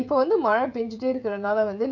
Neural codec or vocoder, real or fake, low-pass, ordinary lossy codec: codec, 16 kHz, 16 kbps, FreqCodec, smaller model; fake; 7.2 kHz; none